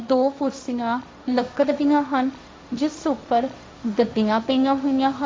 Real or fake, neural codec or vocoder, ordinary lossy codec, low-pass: fake; codec, 16 kHz, 1.1 kbps, Voila-Tokenizer; none; none